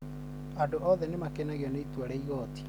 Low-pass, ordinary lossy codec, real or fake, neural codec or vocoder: none; none; real; none